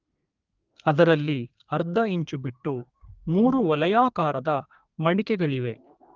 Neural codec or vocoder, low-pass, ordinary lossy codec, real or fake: codec, 32 kHz, 1.9 kbps, SNAC; 7.2 kHz; Opus, 32 kbps; fake